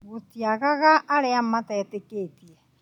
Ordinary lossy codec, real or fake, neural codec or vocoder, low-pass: none; real; none; 19.8 kHz